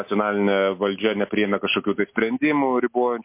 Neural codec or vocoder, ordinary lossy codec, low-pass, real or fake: none; MP3, 24 kbps; 3.6 kHz; real